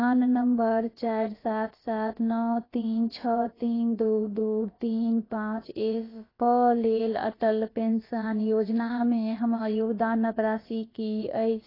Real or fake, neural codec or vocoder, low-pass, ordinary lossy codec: fake; codec, 16 kHz, about 1 kbps, DyCAST, with the encoder's durations; 5.4 kHz; AAC, 24 kbps